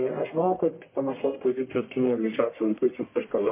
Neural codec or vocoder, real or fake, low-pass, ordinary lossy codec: codec, 44.1 kHz, 1.7 kbps, Pupu-Codec; fake; 3.6 kHz; MP3, 24 kbps